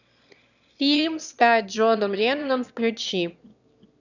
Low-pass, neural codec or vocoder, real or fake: 7.2 kHz; autoencoder, 22.05 kHz, a latent of 192 numbers a frame, VITS, trained on one speaker; fake